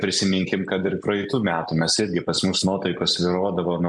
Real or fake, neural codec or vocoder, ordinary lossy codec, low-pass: real; none; MP3, 96 kbps; 10.8 kHz